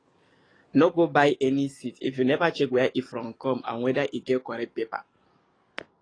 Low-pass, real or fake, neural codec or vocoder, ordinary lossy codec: 9.9 kHz; fake; codec, 44.1 kHz, 7.8 kbps, DAC; AAC, 32 kbps